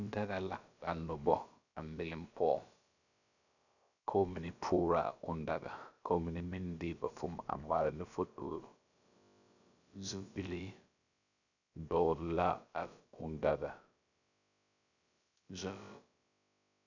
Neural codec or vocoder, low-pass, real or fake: codec, 16 kHz, about 1 kbps, DyCAST, with the encoder's durations; 7.2 kHz; fake